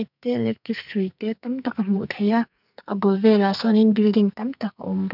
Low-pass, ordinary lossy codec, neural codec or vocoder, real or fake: 5.4 kHz; none; codec, 44.1 kHz, 2.6 kbps, SNAC; fake